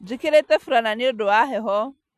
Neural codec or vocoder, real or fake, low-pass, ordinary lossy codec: none; real; 14.4 kHz; none